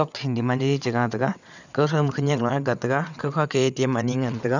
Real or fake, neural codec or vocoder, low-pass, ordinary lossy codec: fake; vocoder, 22.05 kHz, 80 mel bands, WaveNeXt; 7.2 kHz; none